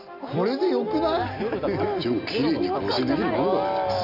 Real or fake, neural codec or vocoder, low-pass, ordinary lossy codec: real; none; 5.4 kHz; none